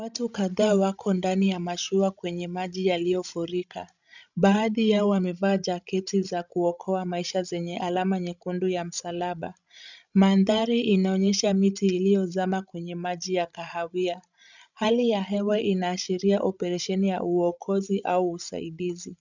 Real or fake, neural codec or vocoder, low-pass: fake; codec, 16 kHz, 16 kbps, FreqCodec, larger model; 7.2 kHz